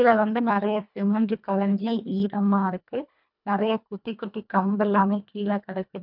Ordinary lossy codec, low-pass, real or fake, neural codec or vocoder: none; 5.4 kHz; fake; codec, 24 kHz, 1.5 kbps, HILCodec